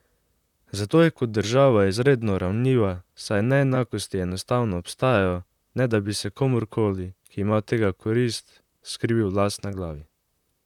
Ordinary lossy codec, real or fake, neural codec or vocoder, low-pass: none; fake; vocoder, 44.1 kHz, 128 mel bands, Pupu-Vocoder; 19.8 kHz